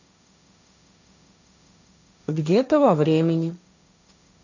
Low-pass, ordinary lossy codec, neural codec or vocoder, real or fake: 7.2 kHz; none; codec, 16 kHz, 1.1 kbps, Voila-Tokenizer; fake